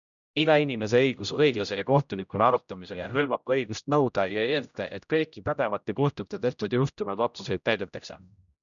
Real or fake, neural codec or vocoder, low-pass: fake; codec, 16 kHz, 0.5 kbps, X-Codec, HuBERT features, trained on general audio; 7.2 kHz